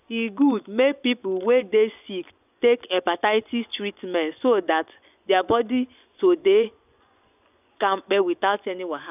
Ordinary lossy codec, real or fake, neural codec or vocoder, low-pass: none; fake; vocoder, 24 kHz, 100 mel bands, Vocos; 3.6 kHz